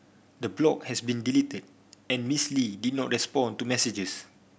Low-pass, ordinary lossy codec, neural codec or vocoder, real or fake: none; none; none; real